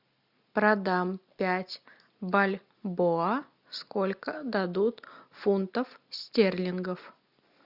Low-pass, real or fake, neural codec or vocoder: 5.4 kHz; real; none